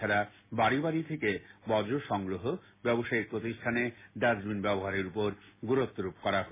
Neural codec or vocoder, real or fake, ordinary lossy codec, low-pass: none; real; MP3, 16 kbps; 3.6 kHz